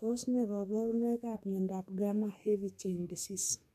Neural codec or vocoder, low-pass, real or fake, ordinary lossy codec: codec, 32 kHz, 1.9 kbps, SNAC; 14.4 kHz; fake; none